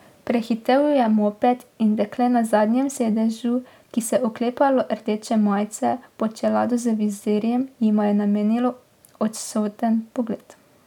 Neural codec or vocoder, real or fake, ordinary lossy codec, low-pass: none; real; none; 19.8 kHz